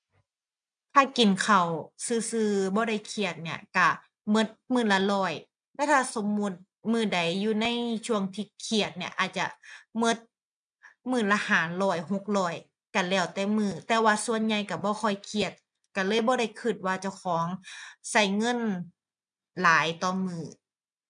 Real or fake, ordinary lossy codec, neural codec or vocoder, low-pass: real; none; none; 9.9 kHz